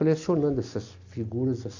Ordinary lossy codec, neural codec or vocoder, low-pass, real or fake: AAC, 48 kbps; none; 7.2 kHz; real